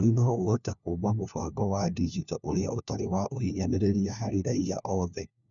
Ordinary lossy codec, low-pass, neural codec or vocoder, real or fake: none; 7.2 kHz; codec, 16 kHz, 1 kbps, FunCodec, trained on LibriTTS, 50 frames a second; fake